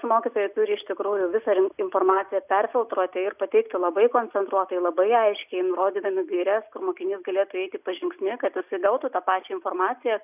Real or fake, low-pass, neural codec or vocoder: real; 3.6 kHz; none